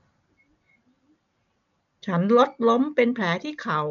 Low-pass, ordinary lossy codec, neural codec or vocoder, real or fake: 7.2 kHz; MP3, 64 kbps; none; real